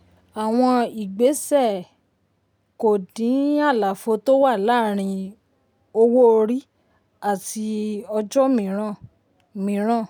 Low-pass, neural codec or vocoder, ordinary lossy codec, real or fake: none; none; none; real